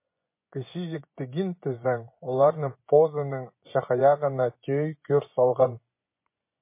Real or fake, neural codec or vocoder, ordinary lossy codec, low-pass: fake; vocoder, 24 kHz, 100 mel bands, Vocos; MP3, 24 kbps; 3.6 kHz